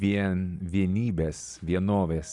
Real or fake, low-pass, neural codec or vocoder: real; 10.8 kHz; none